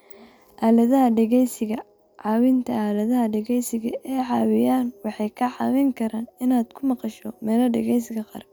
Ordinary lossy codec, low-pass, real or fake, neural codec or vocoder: none; none; real; none